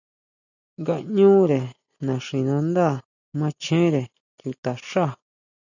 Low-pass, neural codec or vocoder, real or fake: 7.2 kHz; none; real